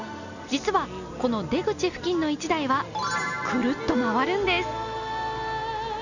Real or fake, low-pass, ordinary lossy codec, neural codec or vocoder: real; 7.2 kHz; none; none